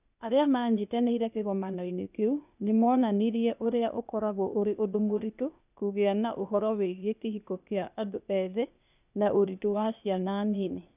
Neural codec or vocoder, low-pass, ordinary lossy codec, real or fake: codec, 16 kHz, 0.8 kbps, ZipCodec; 3.6 kHz; none; fake